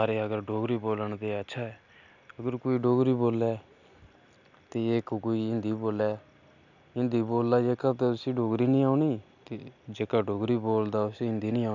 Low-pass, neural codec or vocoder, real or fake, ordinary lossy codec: 7.2 kHz; none; real; none